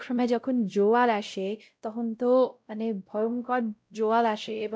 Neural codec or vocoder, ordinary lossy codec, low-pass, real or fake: codec, 16 kHz, 0.5 kbps, X-Codec, WavLM features, trained on Multilingual LibriSpeech; none; none; fake